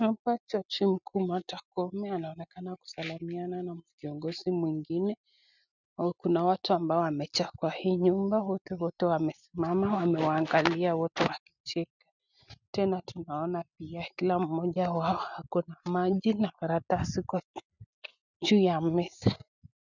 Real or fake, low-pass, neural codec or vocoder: real; 7.2 kHz; none